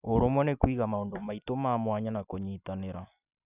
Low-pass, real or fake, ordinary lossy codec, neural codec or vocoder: 3.6 kHz; fake; none; vocoder, 44.1 kHz, 128 mel bands every 256 samples, BigVGAN v2